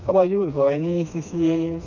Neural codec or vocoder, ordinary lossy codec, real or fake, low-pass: codec, 16 kHz, 2 kbps, FreqCodec, smaller model; none; fake; 7.2 kHz